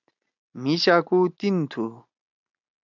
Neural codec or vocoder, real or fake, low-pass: none; real; 7.2 kHz